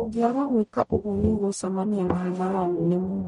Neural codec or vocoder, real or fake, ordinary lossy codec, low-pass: codec, 44.1 kHz, 0.9 kbps, DAC; fake; MP3, 48 kbps; 19.8 kHz